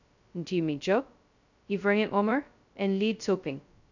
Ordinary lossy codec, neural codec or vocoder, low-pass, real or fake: none; codec, 16 kHz, 0.2 kbps, FocalCodec; 7.2 kHz; fake